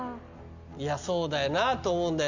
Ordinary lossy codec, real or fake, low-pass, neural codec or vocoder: none; real; 7.2 kHz; none